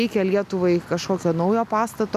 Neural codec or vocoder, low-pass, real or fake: none; 14.4 kHz; real